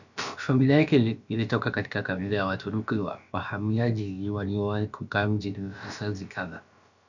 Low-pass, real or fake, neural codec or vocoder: 7.2 kHz; fake; codec, 16 kHz, about 1 kbps, DyCAST, with the encoder's durations